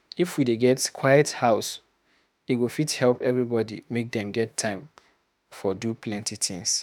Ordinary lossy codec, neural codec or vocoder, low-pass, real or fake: none; autoencoder, 48 kHz, 32 numbers a frame, DAC-VAE, trained on Japanese speech; none; fake